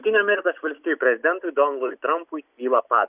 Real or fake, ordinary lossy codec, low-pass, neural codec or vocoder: real; Opus, 64 kbps; 3.6 kHz; none